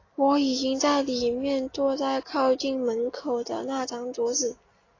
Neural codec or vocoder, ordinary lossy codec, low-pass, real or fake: none; AAC, 32 kbps; 7.2 kHz; real